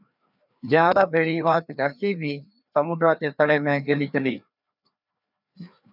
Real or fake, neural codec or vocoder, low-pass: fake; codec, 16 kHz, 2 kbps, FreqCodec, larger model; 5.4 kHz